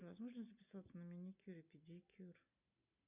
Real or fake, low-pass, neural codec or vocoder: real; 3.6 kHz; none